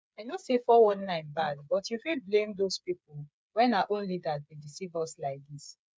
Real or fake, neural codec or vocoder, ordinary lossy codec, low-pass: fake; codec, 16 kHz, 8 kbps, FreqCodec, smaller model; none; none